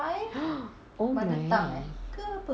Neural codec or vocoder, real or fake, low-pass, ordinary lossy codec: none; real; none; none